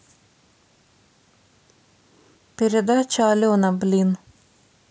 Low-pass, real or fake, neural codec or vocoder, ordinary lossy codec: none; real; none; none